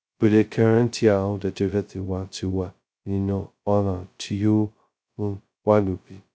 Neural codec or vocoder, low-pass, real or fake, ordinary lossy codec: codec, 16 kHz, 0.2 kbps, FocalCodec; none; fake; none